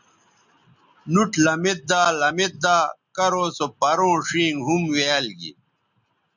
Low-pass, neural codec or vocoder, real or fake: 7.2 kHz; none; real